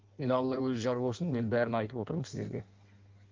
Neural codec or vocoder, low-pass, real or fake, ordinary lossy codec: codec, 16 kHz in and 24 kHz out, 1.1 kbps, FireRedTTS-2 codec; 7.2 kHz; fake; Opus, 32 kbps